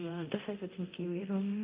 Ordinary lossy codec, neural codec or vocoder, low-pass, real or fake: Opus, 24 kbps; codec, 24 kHz, 0.9 kbps, DualCodec; 3.6 kHz; fake